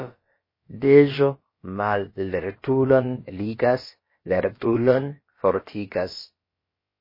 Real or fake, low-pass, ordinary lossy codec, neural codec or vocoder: fake; 5.4 kHz; MP3, 24 kbps; codec, 16 kHz, about 1 kbps, DyCAST, with the encoder's durations